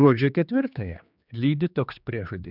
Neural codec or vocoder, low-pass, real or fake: codec, 16 kHz, 2 kbps, X-Codec, HuBERT features, trained on general audio; 5.4 kHz; fake